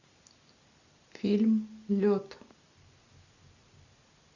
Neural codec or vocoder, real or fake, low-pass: none; real; 7.2 kHz